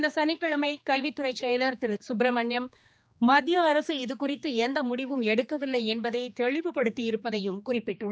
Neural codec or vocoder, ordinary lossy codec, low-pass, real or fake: codec, 16 kHz, 2 kbps, X-Codec, HuBERT features, trained on general audio; none; none; fake